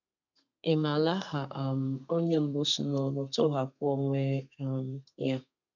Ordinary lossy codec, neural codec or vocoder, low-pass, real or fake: none; codec, 32 kHz, 1.9 kbps, SNAC; 7.2 kHz; fake